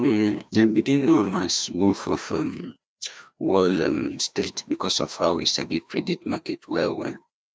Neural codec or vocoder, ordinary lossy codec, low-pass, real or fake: codec, 16 kHz, 1 kbps, FreqCodec, larger model; none; none; fake